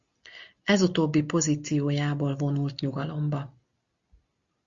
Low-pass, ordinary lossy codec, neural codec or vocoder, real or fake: 7.2 kHz; Opus, 64 kbps; none; real